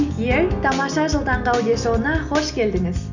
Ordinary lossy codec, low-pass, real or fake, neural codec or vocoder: none; 7.2 kHz; real; none